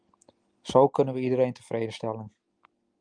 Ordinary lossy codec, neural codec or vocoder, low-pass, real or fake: Opus, 32 kbps; none; 9.9 kHz; real